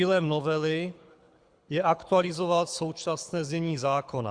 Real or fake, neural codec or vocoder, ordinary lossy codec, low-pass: fake; codec, 24 kHz, 6 kbps, HILCodec; Opus, 64 kbps; 9.9 kHz